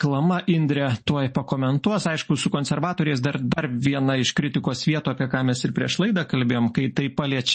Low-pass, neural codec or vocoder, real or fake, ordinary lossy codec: 10.8 kHz; none; real; MP3, 32 kbps